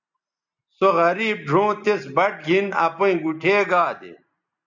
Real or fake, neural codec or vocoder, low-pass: real; none; 7.2 kHz